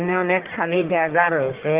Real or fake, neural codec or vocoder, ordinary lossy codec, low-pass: fake; codec, 44.1 kHz, 1.7 kbps, Pupu-Codec; Opus, 16 kbps; 3.6 kHz